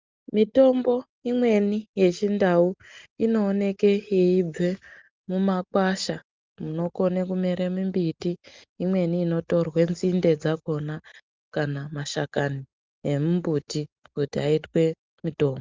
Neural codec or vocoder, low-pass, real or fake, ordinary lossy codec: none; 7.2 kHz; real; Opus, 32 kbps